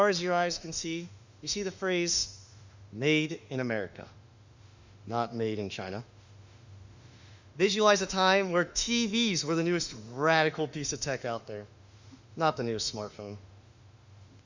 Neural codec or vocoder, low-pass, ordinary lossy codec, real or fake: autoencoder, 48 kHz, 32 numbers a frame, DAC-VAE, trained on Japanese speech; 7.2 kHz; Opus, 64 kbps; fake